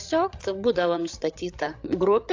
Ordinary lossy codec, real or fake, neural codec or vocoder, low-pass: AAC, 48 kbps; fake; codec, 16 kHz, 8 kbps, FreqCodec, larger model; 7.2 kHz